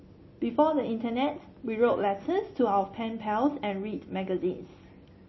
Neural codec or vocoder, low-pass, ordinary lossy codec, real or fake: none; 7.2 kHz; MP3, 24 kbps; real